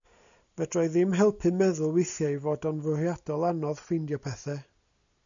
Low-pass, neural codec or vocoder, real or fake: 7.2 kHz; none; real